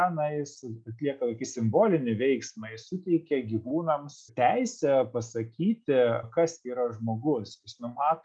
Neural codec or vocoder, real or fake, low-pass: none; real; 9.9 kHz